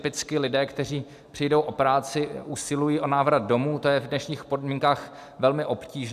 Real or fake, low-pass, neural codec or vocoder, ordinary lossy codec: real; 14.4 kHz; none; AAC, 96 kbps